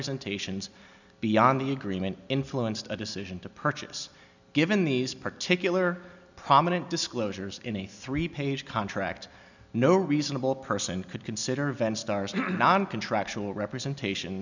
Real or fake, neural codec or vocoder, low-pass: real; none; 7.2 kHz